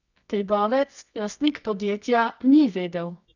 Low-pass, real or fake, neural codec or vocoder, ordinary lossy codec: 7.2 kHz; fake; codec, 24 kHz, 0.9 kbps, WavTokenizer, medium music audio release; none